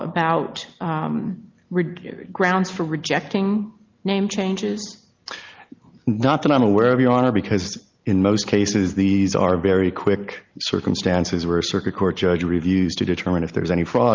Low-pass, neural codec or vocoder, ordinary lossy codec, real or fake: 7.2 kHz; none; Opus, 32 kbps; real